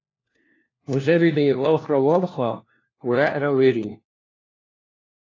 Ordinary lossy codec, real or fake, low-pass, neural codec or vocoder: AAC, 32 kbps; fake; 7.2 kHz; codec, 16 kHz, 1 kbps, FunCodec, trained on LibriTTS, 50 frames a second